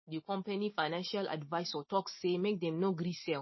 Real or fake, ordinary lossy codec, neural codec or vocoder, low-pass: real; MP3, 24 kbps; none; 7.2 kHz